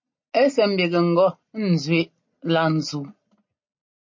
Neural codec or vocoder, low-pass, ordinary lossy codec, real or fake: none; 7.2 kHz; MP3, 32 kbps; real